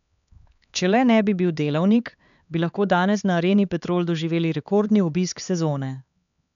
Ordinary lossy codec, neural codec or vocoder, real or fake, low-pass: none; codec, 16 kHz, 4 kbps, X-Codec, HuBERT features, trained on LibriSpeech; fake; 7.2 kHz